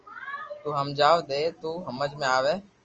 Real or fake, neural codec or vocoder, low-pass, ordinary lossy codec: real; none; 7.2 kHz; Opus, 24 kbps